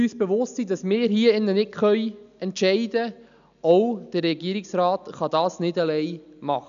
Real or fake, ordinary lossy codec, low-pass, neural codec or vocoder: real; none; 7.2 kHz; none